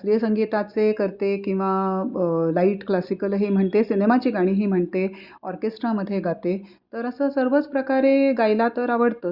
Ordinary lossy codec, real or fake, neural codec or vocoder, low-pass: Opus, 64 kbps; real; none; 5.4 kHz